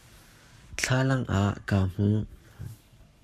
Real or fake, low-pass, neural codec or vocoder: fake; 14.4 kHz; codec, 44.1 kHz, 7.8 kbps, Pupu-Codec